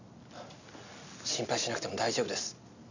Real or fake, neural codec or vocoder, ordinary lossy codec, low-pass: real; none; none; 7.2 kHz